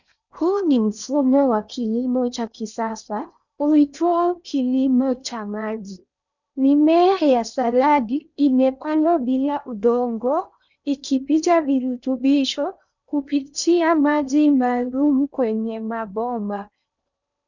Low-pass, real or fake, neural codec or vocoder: 7.2 kHz; fake; codec, 16 kHz in and 24 kHz out, 0.8 kbps, FocalCodec, streaming, 65536 codes